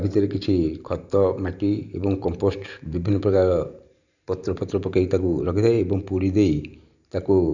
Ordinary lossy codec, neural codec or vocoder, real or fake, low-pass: none; vocoder, 44.1 kHz, 128 mel bands every 512 samples, BigVGAN v2; fake; 7.2 kHz